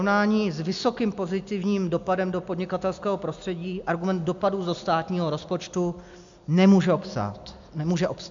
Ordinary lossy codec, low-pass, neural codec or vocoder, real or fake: MP3, 64 kbps; 7.2 kHz; none; real